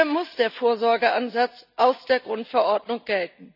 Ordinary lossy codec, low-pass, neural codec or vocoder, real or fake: none; 5.4 kHz; none; real